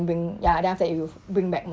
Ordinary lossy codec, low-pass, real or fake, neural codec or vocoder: none; none; real; none